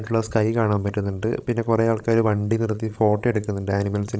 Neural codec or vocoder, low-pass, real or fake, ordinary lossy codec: codec, 16 kHz, 16 kbps, FreqCodec, larger model; none; fake; none